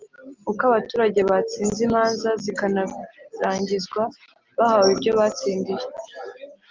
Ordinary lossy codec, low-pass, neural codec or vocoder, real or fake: Opus, 24 kbps; 7.2 kHz; none; real